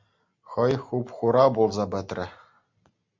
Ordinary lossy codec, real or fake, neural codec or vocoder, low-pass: MP3, 64 kbps; real; none; 7.2 kHz